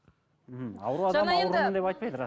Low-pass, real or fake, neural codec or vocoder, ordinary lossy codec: none; real; none; none